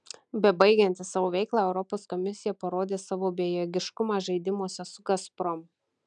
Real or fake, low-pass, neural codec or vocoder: real; 9.9 kHz; none